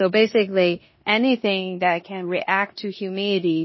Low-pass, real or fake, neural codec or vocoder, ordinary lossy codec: 7.2 kHz; fake; codec, 16 kHz in and 24 kHz out, 0.9 kbps, LongCat-Audio-Codec, four codebook decoder; MP3, 24 kbps